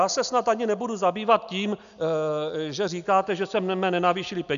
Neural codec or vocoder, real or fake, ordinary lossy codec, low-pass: none; real; AAC, 96 kbps; 7.2 kHz